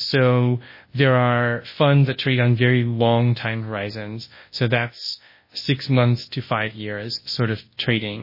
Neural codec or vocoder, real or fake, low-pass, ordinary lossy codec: codec, 24 kHz, 0.9 kbps, WavTokenizer, large speech release; fake; 5.4 kHz; MP3, 24 kbps